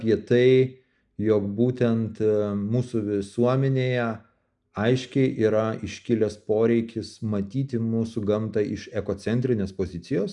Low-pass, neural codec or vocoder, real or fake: 10.8 kHz; none; real